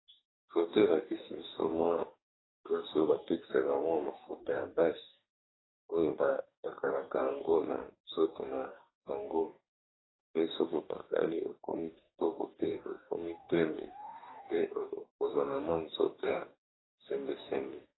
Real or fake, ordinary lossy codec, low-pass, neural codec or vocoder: fake; AAC, 16 kbps; 7.2 kHz; codec, 44.1 kHz, 2.6 kbps, DAC